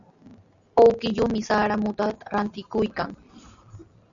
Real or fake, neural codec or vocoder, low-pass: real; none; 7.2 kHz